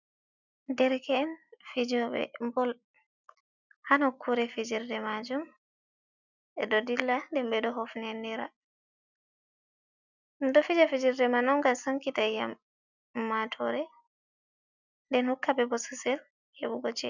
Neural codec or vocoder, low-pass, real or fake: none; 7.2 kHz; real